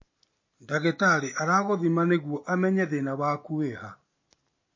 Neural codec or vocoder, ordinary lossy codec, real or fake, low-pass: none; MP3, 32 kbps; real; 7.2 kHz